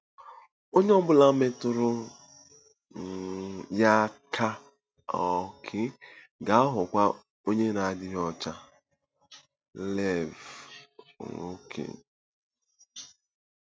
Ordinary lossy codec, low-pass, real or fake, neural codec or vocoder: none; none; real; none